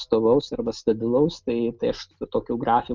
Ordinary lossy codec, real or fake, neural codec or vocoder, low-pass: Opus, 24 kbps; real; none; 7.2 kHz